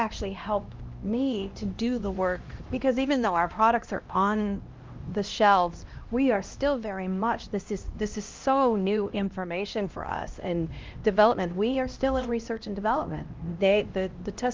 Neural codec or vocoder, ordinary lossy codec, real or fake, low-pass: codec, 16 kHz, 1 kbps, X-Codec, HuBERT features, trained on LibriSpeech; Opus, 32 kbps; fake; 7.2 kHz